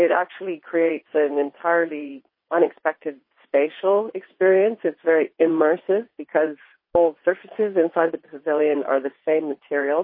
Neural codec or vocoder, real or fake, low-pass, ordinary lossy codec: vocoder, 22.05 kHz, 80 mel bands, WaveNeXt; fake; 5.4 kHz; MP3, 24 kbps